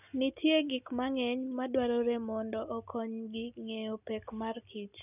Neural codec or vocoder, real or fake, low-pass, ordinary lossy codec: none; real; 3.6 kHz; none